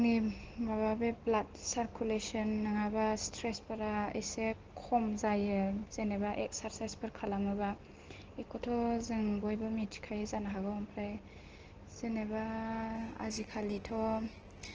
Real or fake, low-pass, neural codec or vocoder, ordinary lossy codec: real; 7.2 kHz; none; Opus, 16 kbps